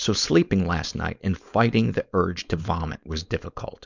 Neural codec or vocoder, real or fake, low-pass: codec, 16 kHz, 4.8 kbps, FACodec; fake; 7.2 kHz